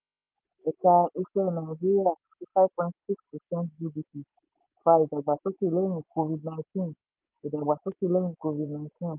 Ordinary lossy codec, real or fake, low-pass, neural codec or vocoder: Opus, 32 kbps; real; 3.6 kHz; none